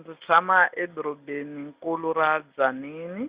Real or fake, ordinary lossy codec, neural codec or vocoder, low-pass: real; Opus, 64 kbps; none; 3.6 kHz